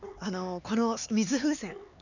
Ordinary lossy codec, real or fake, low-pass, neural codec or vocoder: none; fake; 7.2 kHz; codec, 16 kHz, 4 kbps, X-Codec, HuBERT features, trained on LibriSpeech